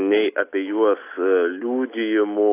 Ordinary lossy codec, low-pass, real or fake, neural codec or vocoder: AAC, 24 kbps; 3.6 kHz; real; none